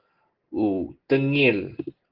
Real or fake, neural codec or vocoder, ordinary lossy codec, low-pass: real; none; Opus, 16 kbps; 5.4 kHz